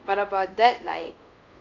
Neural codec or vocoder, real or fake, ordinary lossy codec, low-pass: codec, 24 kHz, 0.5 kbps, DualCodec; fake; none; 7.2 kHz